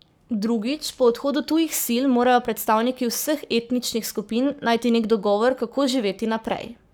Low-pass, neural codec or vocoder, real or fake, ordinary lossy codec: none; codec, 44.1 kHz, 7.8 kbps, Pupu-Codec; fake; none